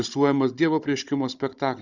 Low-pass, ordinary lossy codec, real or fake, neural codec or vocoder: 7.2 kHz; Opus, 64 kbps; fake; codec, 16 kHz, 16 kbps, FunCodec, trained on Chinese and English, 50 frames a second